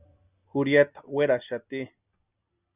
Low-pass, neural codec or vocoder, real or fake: 3.6 kHz; none; real